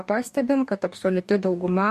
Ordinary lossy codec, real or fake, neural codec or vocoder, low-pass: MP3, 64 kbps; fake; codec, 44.1 kHz, 2.6 kbps, DAC; 14.4 kHz